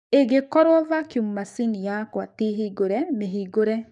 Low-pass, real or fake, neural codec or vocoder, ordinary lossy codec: 10.8 kHz; fake; codec, 44.1 kHz, 7.8 kbps, DAC; none